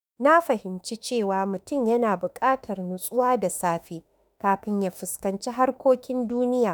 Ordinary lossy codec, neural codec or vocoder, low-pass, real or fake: none; autoencoder, 48 kHz, 32 numbers a frame, DAC-VAE, trained on Japanese speech; none; fake